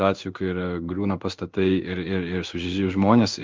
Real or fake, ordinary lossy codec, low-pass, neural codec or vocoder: fake; Opus, 24 kbps; 7.2 kHz; codec, 16 kHz in and 24 kHz out, 1 kbps, XY-Tokenizer